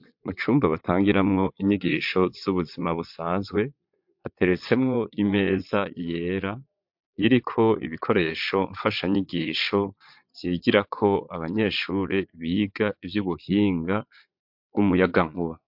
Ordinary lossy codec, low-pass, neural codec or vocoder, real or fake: MP3, 48 kbps; 5.4 kHz; vocoder, 22.05 kHz, 80 mel bands, Vocos; fake